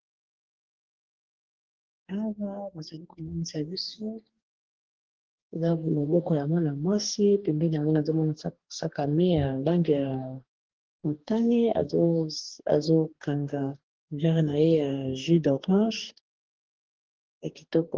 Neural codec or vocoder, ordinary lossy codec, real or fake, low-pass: codec, 44.1 kHz, 2.6 kbps, DAC; Opus, 16 kbps; fake; 7.2 kHz